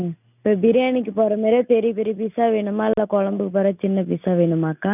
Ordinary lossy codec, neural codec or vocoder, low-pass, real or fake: none; none; 3.6 kHz; real